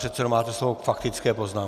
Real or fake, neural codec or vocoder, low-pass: real; none; 14.4 kHz